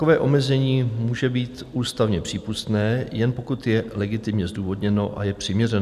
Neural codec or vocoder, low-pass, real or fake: none; 14.4 kHz; real